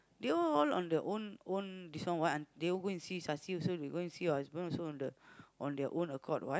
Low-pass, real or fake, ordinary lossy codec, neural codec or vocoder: none; real; none; none